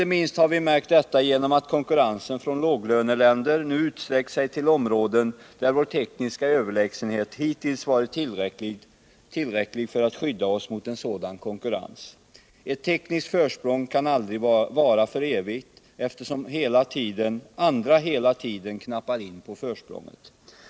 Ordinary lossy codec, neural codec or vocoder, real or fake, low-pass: none; none; real; none